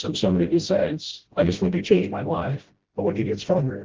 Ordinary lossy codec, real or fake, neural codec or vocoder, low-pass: Opus, 16 kbps; fake; codec, 16 kHz, 0.5 kbps, FreqCodec, smaller model; 7.2 kHz